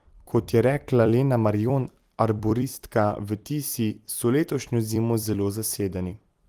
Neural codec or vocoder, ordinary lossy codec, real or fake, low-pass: vocoder, 44.1 kHz, 128 mel bands every 256 samples, BigVGAN v2; Opus, 24 kbps; fake; 14.4 kHz